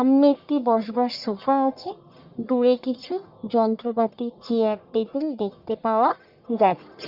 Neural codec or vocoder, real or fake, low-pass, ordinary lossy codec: codec, 44.1 kHz, 1.7 kbps, Pupu-Codec; fake; 5.4 kHz; Opus, 64 kbps